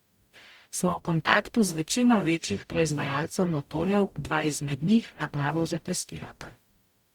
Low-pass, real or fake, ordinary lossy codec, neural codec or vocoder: 19.8 kHz; fake; Opus, 64 kbps; codec, 44.1 kHz, 0.9 kbps, DAC